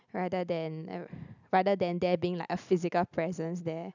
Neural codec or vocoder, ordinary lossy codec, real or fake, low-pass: none; none; real; 7.2 kHz